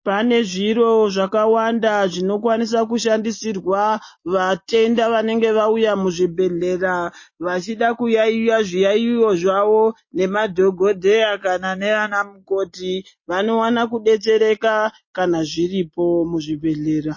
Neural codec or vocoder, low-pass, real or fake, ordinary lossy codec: none; 7.2 kHz; real; MP3, 32 kbps